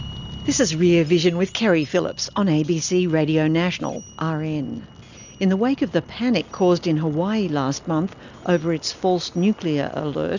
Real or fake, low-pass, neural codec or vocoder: real; 7.2 kHz; none